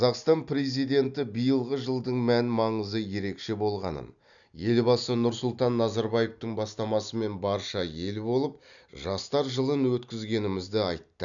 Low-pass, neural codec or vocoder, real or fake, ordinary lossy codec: 7.2 kHz; none; real; none